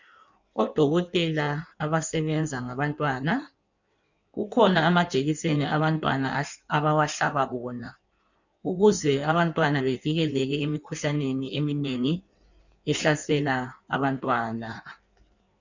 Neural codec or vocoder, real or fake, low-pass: codec, 16 kHz in and 24 kHz out, 1.1 kbps, FireRedTTS-2 codec; fake; 7.2 kHz